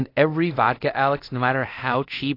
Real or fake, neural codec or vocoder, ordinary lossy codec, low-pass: fake; codec, 24 kHz, 0.5 kbps, DualCodec; AAC, 32 kbps; 5.4 kHz